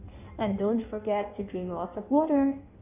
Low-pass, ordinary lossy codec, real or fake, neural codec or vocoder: 3.6 kHz; none; fake; codec, 16 kHz in and 24 kHz out, 1.1 kbps, FireRedTTS-2 codec